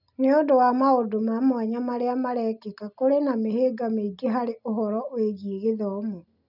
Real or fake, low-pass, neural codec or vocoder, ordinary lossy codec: real; 5.4 kHz; none; none